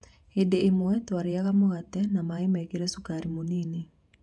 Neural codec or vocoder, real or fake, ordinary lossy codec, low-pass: vocoder, 48 kHz, 128 mel bands, Vocos; fake; none; 10.8 kHz